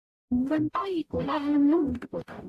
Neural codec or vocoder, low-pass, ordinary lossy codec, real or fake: codec, 44.1 kHz, 0.9 kbps, DAC; 14.4 kHz; AAC, 64 kbps; fake